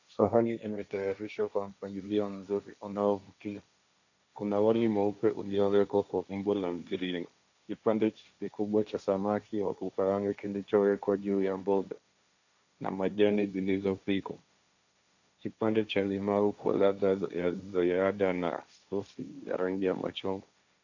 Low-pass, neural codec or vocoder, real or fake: 7.2 kHz; codec, 16 kHz, 1.1 kbps, Voila-Tokenizer; fake